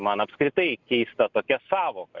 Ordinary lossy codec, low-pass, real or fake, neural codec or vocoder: Opus, 64 kbps; 7.2 kHz; real; none